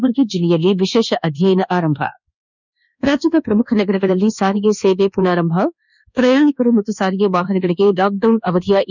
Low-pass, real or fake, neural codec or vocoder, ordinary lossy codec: 7.2 kHz; fake; codec, 24 kHz, 1.2 kbps, DualCodec; none